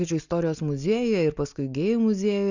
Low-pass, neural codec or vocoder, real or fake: 7.2 kHz; none; real